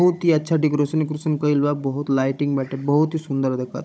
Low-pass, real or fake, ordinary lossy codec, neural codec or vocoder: none; fake; none; codec, 16 kHz, 16 kbps, FunCodec, trained on Chinese and English, 50 frames a second